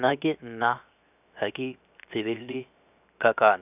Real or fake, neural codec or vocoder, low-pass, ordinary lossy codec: fake; codec, 16 kHz, 0.7 kbps, FocalCodec; 3.6 kHz; none